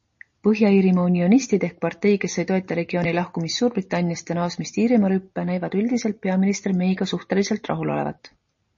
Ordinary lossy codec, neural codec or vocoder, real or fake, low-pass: MP3, 32 kbps; none; real; 7.2 kHz